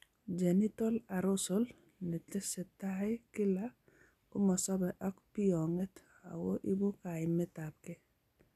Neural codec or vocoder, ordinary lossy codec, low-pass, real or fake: none; none; 14.4 kHz; real